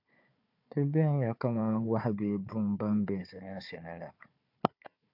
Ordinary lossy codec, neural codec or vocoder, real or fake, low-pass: AAC, 48 kbps; codec, 16 kHz, 4 kbps, FunCodec, trained on Chinese and English, 50 frames a second; fake; 5.4 kHz